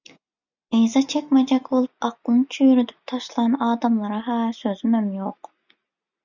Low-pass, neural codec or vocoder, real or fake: 7.2 kHz; none; real